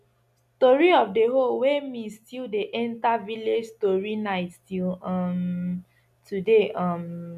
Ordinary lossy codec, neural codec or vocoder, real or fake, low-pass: none; none; real; 14.4 kHz